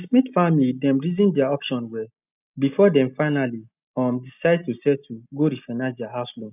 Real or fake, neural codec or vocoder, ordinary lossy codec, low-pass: real; none; none; 3.6 kHz